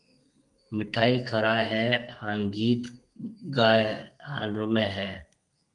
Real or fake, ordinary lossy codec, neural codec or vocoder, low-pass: fake; Opus, 32 kbps; codec, 32 kHz, 1.9 kbps, SNAC; 10.8 kHz